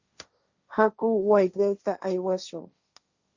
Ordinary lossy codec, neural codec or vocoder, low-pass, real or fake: Opus, 64 kbps; codec, 16 kHz, 1.1 kbps, Voila-Tokenizer; 7.2 kHz; fake